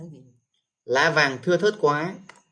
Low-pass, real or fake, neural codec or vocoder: 9.9 kHz; real; none